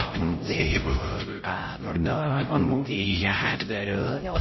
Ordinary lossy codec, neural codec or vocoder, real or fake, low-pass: MP3, 24 kbps; codec, 16 kHz, 0.5 kbps, X-Codec, HuBERT features, trained on LibriSpeech; fake; 7.2 kHz